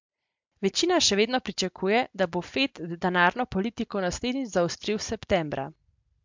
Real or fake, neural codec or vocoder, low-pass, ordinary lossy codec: real; none; 7.2 kHz; MP3, 64 kbps